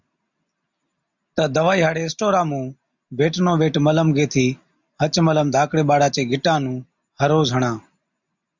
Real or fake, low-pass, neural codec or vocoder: real; 7.2 kHz; none